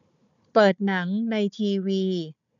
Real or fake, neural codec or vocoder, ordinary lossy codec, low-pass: fake; codec, 16 kHz, 4 kbps, FunCodec, trained on Chinese and English, 50 frames a second; none; 7.2 kHz